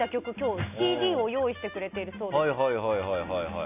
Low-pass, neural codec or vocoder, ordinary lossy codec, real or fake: 3.6 kHz; none; none; real